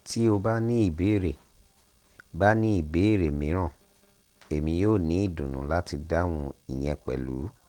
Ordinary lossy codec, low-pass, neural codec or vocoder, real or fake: Opus, 16 kbps; 19.8 kHz; none; real